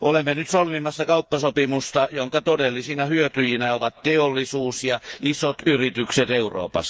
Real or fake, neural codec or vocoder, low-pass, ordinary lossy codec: fake; codec, 16 kHz, 4 kbps, FreqCodec, smaller model; none; none